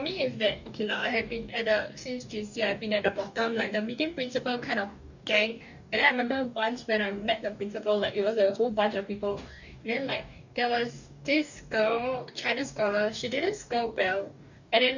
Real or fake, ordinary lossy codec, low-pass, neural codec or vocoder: fake; none; 7.2 kHz; codec, 44.1 kHz, 2.6 kbps, DAC